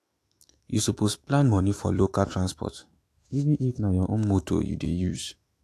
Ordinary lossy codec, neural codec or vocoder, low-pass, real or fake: AAC, 64 kbps; autoencoder, 48 kHz, 128 numbers a frame, DAC-VAE, trained on Japanese speech; 14.4 kHz; fake